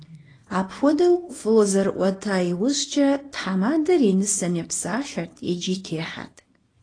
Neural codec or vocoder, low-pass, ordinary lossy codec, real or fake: codec, 24 kHz, 0.9 kbps, WavTokenizer, small release; 9.9 kHz; AAC, 32 kbps; fake